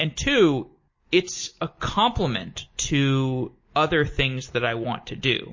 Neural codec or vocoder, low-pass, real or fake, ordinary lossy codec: none; 7.2 kHz; real; MP3, 32 kbps